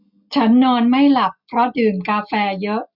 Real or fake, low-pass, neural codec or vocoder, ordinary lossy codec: real; 5.4 kHz; none; none